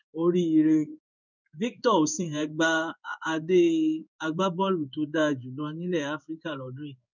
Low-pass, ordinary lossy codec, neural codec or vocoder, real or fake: 7.2 kHz; none; codec, 16 kHz in and 24 kHz out, 1 kbps, XY-Tokenizer; fake